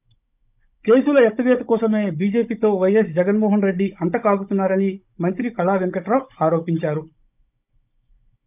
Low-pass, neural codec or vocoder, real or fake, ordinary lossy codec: 3.6 kHz; codec, 16 kHz, 16 kbps, FunCodec, trained on Chinese and English, 50 frames a second; fake; none